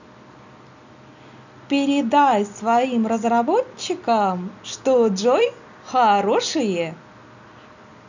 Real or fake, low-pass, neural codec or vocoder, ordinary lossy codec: real; 7.2 kHz; none; none